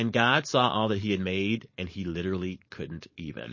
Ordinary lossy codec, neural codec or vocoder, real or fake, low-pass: MP3, 32 kbps; codec, 16 kHz, 4.8 kbps, FACodec; fake; 7.2 kHz